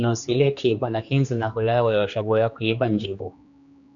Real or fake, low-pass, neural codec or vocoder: fake; 7.2 kHz; codec, 16 kHz, 2 kbps, X-Codec, HuBERT features, trained on general audio